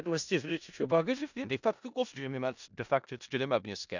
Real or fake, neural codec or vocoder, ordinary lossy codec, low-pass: fake; codec, 16 kHz in and 24 kHz out, 0.4 kbps, LongCat-Audio-Codec, four codebook decoder; none; 7.2 kHz